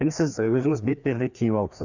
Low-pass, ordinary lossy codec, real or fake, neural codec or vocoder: 7.2 kHz; none; fake; codec, 16 kHz, 2 kbps, FreqCodec, larger model